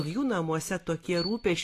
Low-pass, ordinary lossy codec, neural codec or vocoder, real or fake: 14.4 kHz; AAC, 48 kbps; none; real